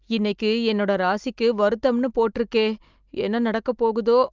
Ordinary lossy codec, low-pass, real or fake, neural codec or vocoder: Opus, 24 kbps; 7.2 kHz; real; none